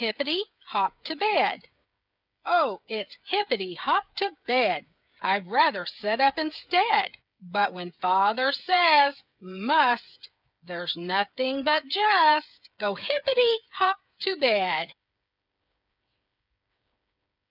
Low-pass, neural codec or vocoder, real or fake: 5.4 kHz; codec, 16 kHz, 8 kbps, FreqCodec, smaller model; fake